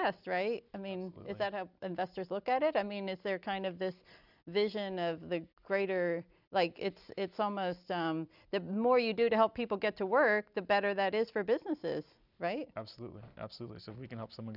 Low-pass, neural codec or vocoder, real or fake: 5.4 kHz; none; real